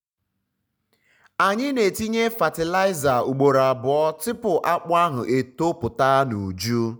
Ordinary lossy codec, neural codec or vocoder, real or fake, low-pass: none; none; real; none